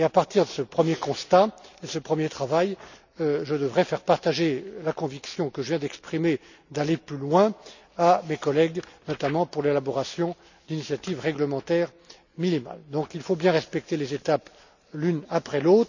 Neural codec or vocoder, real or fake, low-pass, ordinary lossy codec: none; real; 7.2 kHz; none